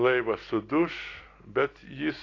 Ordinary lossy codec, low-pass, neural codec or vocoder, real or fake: AAC, 32 kbps; 7.2 kHz; none; real